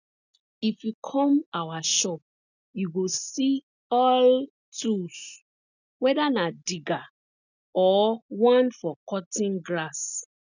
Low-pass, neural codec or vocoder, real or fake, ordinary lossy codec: 7.2 kHz; none; real; none